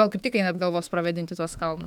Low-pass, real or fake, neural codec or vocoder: 19.8 kHz; fake; autoencoder, 48 kHz, 32 numbers a frame, DAC-VAE, trained on Japanese speech